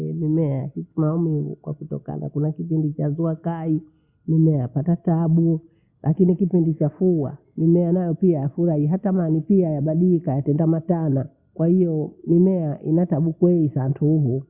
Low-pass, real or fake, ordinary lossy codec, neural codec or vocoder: 3.6 kHz; real; Opus, 64 kbps; none